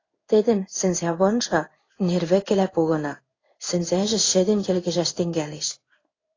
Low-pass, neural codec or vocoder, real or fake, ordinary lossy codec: 7.2 kHz; codec, 16 kHz in and 24 kHz out, 1 kbps, XY-Tokenizer; fake; AAC, 32 kbps